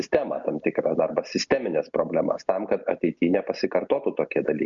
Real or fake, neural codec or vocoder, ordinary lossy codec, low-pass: real; none; Opus, 64 kbps; 7.2 kHz